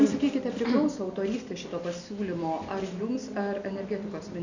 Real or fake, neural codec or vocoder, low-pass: real; none; 7.2 kHz